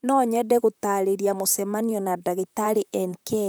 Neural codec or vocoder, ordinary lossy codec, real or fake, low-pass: vocoder, 44.1 kHz, 128 mel bands, Pupu-Vocoder; none; fake; none